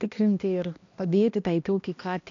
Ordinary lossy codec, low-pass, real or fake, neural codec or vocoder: AAC, 48 kbps; 7.2 kHz; fake; codec, 16 kHz, 1 kbps, X-Codec, HuBERT features, trained on balanced general audio